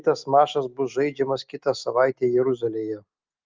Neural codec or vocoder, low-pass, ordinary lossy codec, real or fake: none; 7.2 kHz; Opus, 24 kbps; real